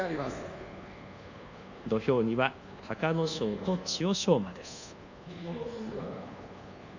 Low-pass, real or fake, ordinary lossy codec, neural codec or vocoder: 7.2 kHz; fake; none; codec, 24 kHz, 1.2 kbps, DualCodec